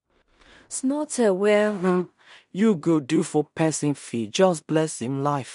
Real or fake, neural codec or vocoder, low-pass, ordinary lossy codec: fake; codec, 16 kHz in and 24 kHz out, 0.4 kbps, LongCat-Audio-Codec, two codebook decoder; 10.8 kHz; MP3, 64 kbps